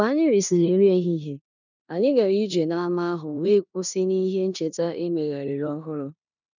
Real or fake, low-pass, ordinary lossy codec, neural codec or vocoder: fake; 7.2 kHz; none; codec, 16 kHz in and 24 kHz out, 0.9 kbps, LongCat-Audio-Codec, four codebook decoder